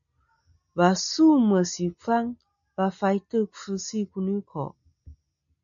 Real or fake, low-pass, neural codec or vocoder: real; 7.2 kHz; none